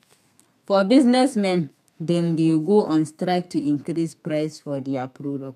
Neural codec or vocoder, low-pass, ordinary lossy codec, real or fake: codec, 32 kHz, 1.9 kbps, SNAC; 14.4 kHz; none; fake